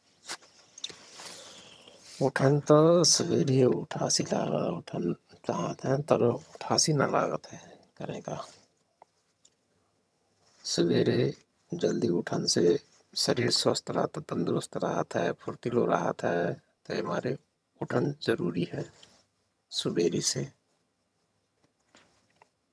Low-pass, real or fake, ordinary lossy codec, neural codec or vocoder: none; fake; none; vocoder, 22.05 kHz, 80 mel bands, HiFi-GAN